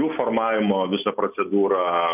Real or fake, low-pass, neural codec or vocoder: real; 3.6 kHz; none